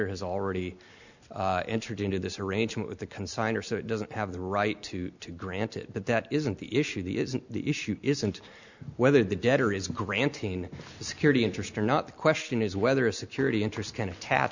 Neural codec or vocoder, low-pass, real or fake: none; 7.2 kHz; real